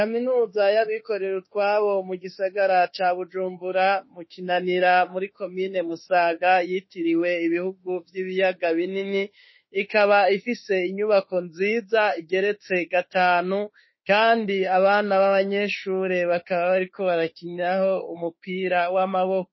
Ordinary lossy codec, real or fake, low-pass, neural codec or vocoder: MP3, 24 kbps; fake; 7.2 kHz; autoencoder, 48 kHz, 32 numbers a frame, DAC-VAE, trained on Japanese speech